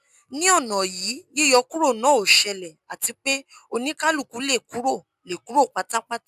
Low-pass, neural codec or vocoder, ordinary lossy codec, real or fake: 14.4 kHz; none; none; real